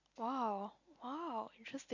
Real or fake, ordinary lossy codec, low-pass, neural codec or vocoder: real; none; 7.2 kHz; none